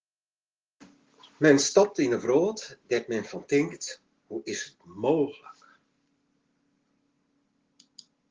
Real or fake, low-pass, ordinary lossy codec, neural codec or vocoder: real; 7.2 kHz; Opus, 16 kbps; none